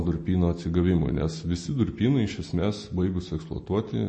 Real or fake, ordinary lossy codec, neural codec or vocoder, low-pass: real; MP3, 32 kbps; none; 10.8 kHz